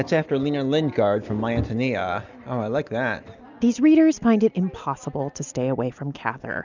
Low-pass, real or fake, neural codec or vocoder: 7.2 kHz; real; none